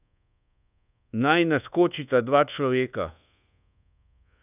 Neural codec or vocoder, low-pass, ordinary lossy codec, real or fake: codec, 24 kHz, 1.2 kbps, DualCodec; 3.6 kHz; none; fake